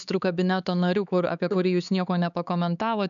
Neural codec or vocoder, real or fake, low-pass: codec, 16 kHz, 4 kbps, X-Codec, HuBERT features, trained on LibriSpeech; fake; 7.2 kHz